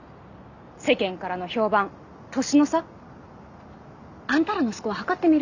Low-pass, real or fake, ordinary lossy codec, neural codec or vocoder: 7.2 kHz; real; none; none